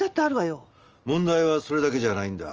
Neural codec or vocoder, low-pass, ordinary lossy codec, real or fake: none; 7.2 kHz; Opus, 24 kbps; real